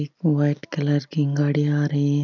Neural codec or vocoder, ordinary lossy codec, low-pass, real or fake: none; none; 7.2 kHz; real